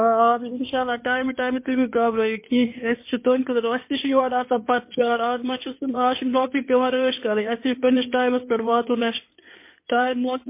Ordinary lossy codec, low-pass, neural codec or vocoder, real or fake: MP3, 24 kbps; 3.6 kHz; codec, 16 kHz in and 24 kHz out, 2.2 kbps, FireRedTTS-2 codec; fake